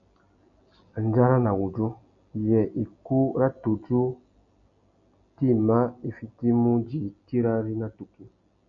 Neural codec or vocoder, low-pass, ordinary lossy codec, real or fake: none; 7.2 kHz; MP3, 96 kbps; real